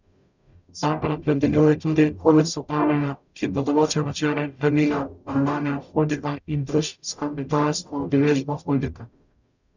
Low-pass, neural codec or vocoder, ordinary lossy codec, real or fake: 7.2 kHz; codec, 44.1 kHz, 0.9 kbps, DAC; none; fake